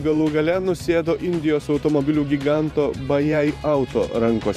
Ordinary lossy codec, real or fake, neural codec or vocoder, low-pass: AAC, 96 kbps; fake; vocoder, 44.1 kHz, 128 mel bands every 512 samples, BigVGAN v2; 14.4 kHz